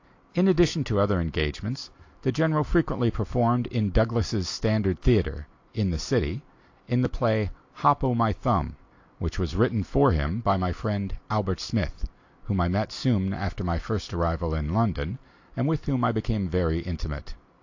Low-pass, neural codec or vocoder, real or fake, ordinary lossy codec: 7.2 kHz; none; real; AAC, 48 kbps